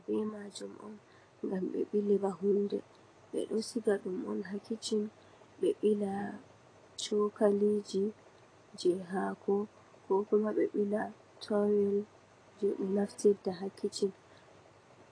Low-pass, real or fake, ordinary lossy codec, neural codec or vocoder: 9.9 kHz; real; AAC, 32 kbps; none